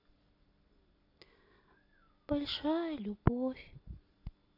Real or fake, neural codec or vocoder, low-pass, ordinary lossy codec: real; none; 5.4 kHz; AAC, 32 kbps